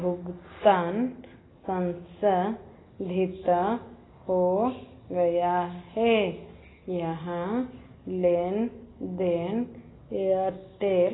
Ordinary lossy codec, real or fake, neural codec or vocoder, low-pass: AAC, 16 kbps; real; none; 7.2 kHz